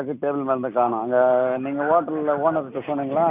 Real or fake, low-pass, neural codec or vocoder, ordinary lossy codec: real; 3.6 kHz; none; none